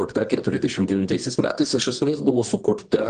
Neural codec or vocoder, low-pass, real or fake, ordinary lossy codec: codec, 24 kHz, 0.9 kbps, WavTokenizer, medium music audio release; 10.8 kHz; fake; Opus, 24 kbps